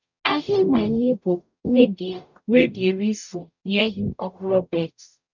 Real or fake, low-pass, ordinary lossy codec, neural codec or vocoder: fake; 7.2 kHz; none; codec, 44.1 kHz, 0.9 kbps, DAC